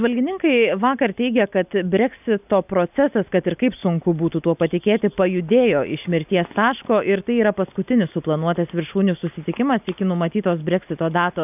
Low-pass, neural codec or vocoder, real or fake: 3.6 kHz; none; real